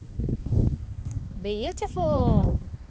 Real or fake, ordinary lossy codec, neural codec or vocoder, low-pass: fake; none; codec, 16 kHz, 2 kbps, X-Codec, HuBERT features, trained on balanced general audio; none